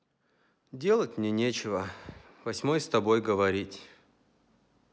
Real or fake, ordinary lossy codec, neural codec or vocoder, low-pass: real; none; none; none